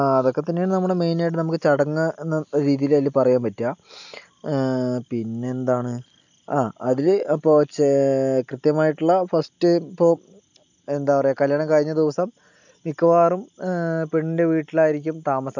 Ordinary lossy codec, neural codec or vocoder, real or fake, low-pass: none; none; real; 7.2 kHz